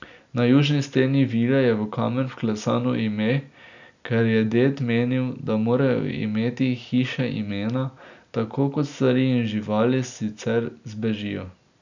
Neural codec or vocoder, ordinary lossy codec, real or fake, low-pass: none; none; real; 7.2 kHz